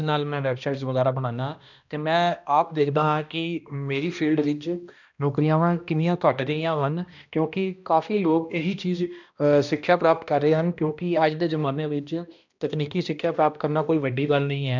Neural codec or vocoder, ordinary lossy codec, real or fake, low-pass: codec, 16 kHz, 1 kbps, X-Codec, HuBERT features, trained on balanced general audio; none; fake; 7.2 kHz